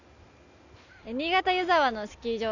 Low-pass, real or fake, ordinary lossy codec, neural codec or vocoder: 7.2 kHz; real; none; none